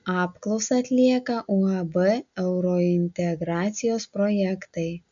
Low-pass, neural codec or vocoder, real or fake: 7.2 kHz; none; real